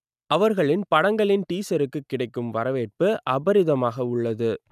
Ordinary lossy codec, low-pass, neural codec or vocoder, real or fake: none; 14.4 kHz; none; real